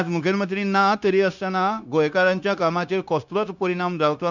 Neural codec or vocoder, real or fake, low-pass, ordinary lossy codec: codec, 16 kHz, 0.9 kbps, LongCat-Audio-Codec; fake; 7.2 kHz; none